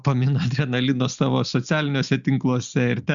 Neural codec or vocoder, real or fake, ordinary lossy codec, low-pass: none; real; Opus, 64 kbps; 7.2 kHz